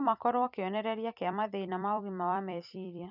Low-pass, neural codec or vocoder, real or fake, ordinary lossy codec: 5.4 kHz; vocoder, 44.1 kHz, 128 mel bands every 256 samples, BigVGAN v2; fake; none